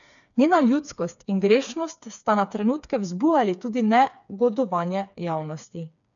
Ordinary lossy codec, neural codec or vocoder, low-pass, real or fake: none; codec, 16 kHz, 4 kbps, FreqCodec, smaller model; 7.2 kHz; fake